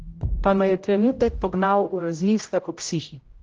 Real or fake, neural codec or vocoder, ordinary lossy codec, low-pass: fake; codec, 16 kHz, 0.5 kbps, X-Codec, HuBERT features, trained on general audio; Opus, 16 kbps; 7.2 kHz